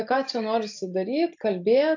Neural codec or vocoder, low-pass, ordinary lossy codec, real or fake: none; 7.2 kHz; AAC, 48 kbps; real